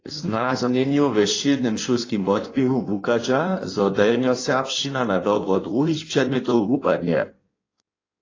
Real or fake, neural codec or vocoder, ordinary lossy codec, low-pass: fake; codec, 16 kHz in and 24 kHz out, 1.1 kbps, FireRedTTS-2 codec; AAC, 32 kbps; 7.2 kHz